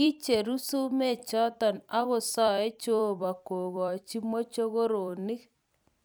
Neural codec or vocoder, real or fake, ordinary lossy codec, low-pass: vocoder, 44.1 kHz, 128 mel bands every 256 samples, BigVGAN v2; fake; none; none